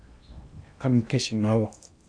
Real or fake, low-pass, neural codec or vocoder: fake; 9.9 kHz; codec, 16 kHz in and 24 kHz out, 0.8 kbps, FocalCodec, streaming, 65536 codes